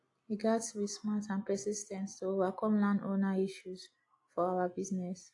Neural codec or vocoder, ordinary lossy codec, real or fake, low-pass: none; AAC, 48 kbps; real; 10.8 kHz